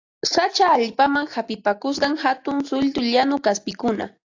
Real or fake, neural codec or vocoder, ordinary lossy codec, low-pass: real; none; AAC, 48 kbps; 7.2 kHz